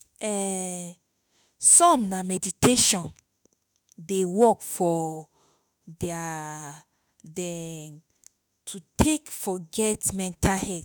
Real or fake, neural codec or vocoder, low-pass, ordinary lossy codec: fake; autoencoder, 48 kHz, 32 numbers a frame, DAC-VAE, trained on Japanese speech; none; none